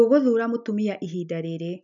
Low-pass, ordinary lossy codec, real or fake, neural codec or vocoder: 7.2 kHz; none; real; none